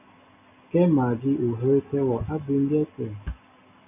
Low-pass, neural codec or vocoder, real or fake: 3.6 kHz; none; real